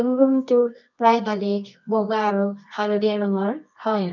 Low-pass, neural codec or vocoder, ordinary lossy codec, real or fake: 7.2 kHz; codec, 24 kHz, 0.9 kbps, WavTokenizer, medium music audio release; none; fake